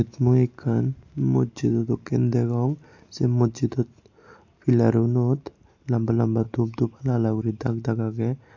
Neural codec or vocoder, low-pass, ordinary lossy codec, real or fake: none; 7.2 kHz; AAC, 48 kbps; real